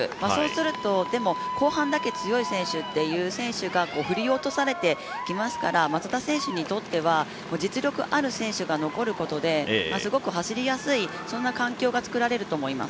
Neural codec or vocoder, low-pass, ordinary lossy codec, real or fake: none; none; none; real